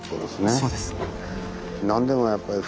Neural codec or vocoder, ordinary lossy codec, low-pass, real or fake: none; none; none; real